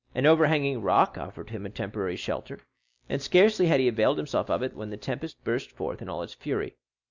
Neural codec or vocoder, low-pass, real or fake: none; 7.2 kHz; real